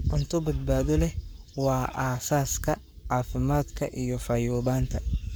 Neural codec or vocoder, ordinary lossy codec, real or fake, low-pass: codec, 44.1 kHz, 7.8 kbps, Pupu-Codec; none; fake; none